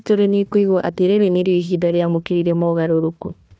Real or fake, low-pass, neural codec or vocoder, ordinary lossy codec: fake; none; codec, 16 kHz, 1 kbps, FunCodec, trained on Chinese and English, 50 frames a second; none